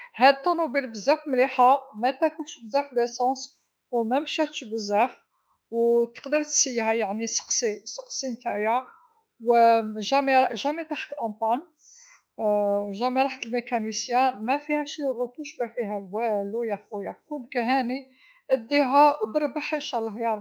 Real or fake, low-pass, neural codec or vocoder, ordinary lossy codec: fake; none; autoencoder, 48 kHz, 32 numbers a frame, DAC-VAE, trained on Japanese speech; none